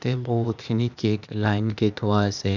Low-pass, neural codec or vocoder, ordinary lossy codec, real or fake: 7.2 kHz; codec, 16 kHz, 0.8 kbps, ZipCodec; none; fake